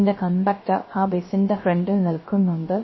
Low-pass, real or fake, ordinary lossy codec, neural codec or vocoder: 7.2 kHz; fake; MP3, 24 kbps; codec, 16 kHz, 0.3 kbps, FocalCodec